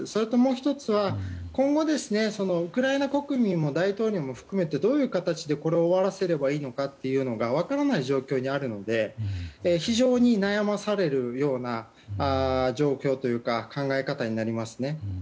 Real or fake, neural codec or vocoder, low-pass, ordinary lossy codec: real; none; none; none